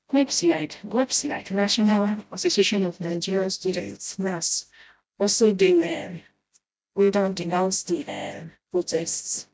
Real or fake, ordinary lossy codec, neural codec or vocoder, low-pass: fake; none; codec, 16 kHz, 0.5 kbps, FreqCodec, smaller model; none